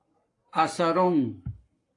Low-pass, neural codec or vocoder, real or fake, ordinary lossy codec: 10.8 kHz; codec, 44.1 kHz, 7.8 kbps, Pupu-Codec; fake; AAC, 48 kbps